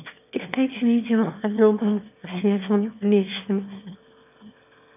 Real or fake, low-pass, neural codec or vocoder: fake; 3.6 kHz; autoencoder, 22.05 kHz, a latent of 192 numbers a frame, VITS, trained on one speaker